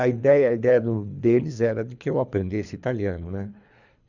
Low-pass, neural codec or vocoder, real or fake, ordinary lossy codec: 7.2 kHz; codec, 24 kHz, 3 kbps, HILCodec; fake; none